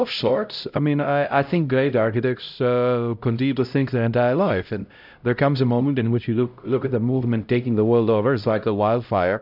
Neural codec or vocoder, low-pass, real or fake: codec, 16 kHz, 0.5 kbps, X-Codec, HuBERT features, trained on LibriSpeech; 5.4 kHz; fake